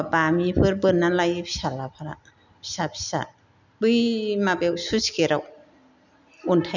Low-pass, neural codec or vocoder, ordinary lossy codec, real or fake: 7.2 kHz; none; none; real